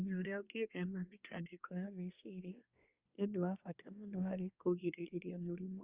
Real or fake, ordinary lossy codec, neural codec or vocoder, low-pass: fake; Opus, 64 kbps; codec, 24 kHz, 1 kbps, SNAC; 3.6 kHz